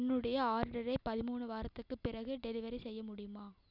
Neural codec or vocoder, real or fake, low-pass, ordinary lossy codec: none; real; 5.4 kHz; none